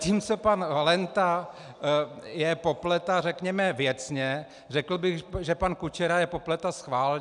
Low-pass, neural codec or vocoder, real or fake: 10.8 kHz; none; real